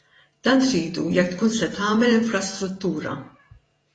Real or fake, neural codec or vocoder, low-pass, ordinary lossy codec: fake; vocoder, 48 kHz, 128 mel bands, Vocos; 9.9 kHz; AAC, 32 kbps